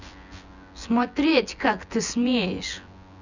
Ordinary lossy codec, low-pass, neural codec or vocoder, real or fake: none; 7.2 kHz; vocoder, 24 kHz, 100 mel bands, Vocos; fake